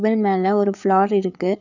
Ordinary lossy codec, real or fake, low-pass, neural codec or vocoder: none; fake; 7.2 kHz; codec, 16 kHz, 4 kbps, FreqCodec, larger model